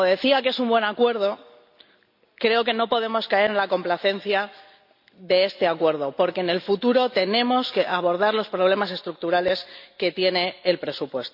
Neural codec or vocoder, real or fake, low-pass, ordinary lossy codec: none; real; 5.4 kHz; none